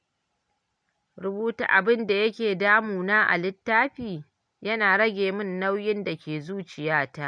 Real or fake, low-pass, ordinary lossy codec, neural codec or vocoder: real; 9.9 kHz; none; none